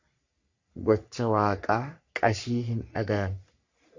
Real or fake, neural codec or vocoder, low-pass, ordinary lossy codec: fake; codec, 44.1 kHz, 3.4 kbps, Pupu-Codec; 7.2 kHz; Opus, 64 kbps